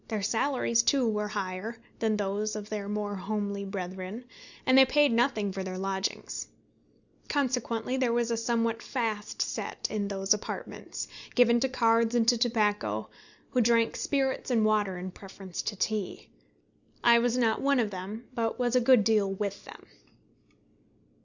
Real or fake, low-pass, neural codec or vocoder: real; 7.2 kHz; none